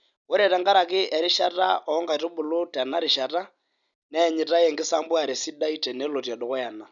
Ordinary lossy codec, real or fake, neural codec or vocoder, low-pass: none; real; none; 7.2 kHz